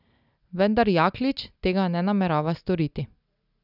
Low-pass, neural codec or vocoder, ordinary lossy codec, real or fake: 5.4 kHz; none; none; real